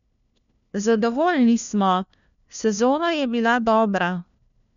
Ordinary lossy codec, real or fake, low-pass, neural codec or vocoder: none; fake; 7.2 kHz; codec, 16 kHz, 1 kbps, FunCodec, trained on LibriTTS, 50 frames a second